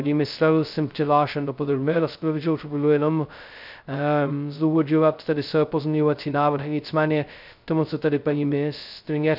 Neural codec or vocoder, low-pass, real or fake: codec, 16 kHz, 0.2 kbps, FocalCodec; 5.4 kHz; fake